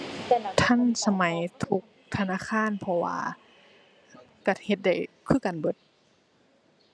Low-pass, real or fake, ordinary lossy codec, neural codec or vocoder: none; real; none; none